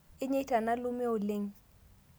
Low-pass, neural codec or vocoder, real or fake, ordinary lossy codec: none; none; real; none